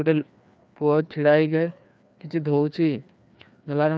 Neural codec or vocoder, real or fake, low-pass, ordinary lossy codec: codec, 16 kHz, 2 kbps, FreqCodec, larger model; fake; none; none